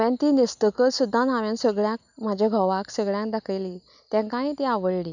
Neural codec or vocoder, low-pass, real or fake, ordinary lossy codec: none; 7.2 kHz; real; none